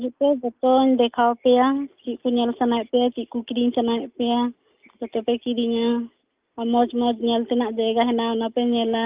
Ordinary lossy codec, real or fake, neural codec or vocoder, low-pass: Opus, 24 kbps; real; none; 3.6 kHz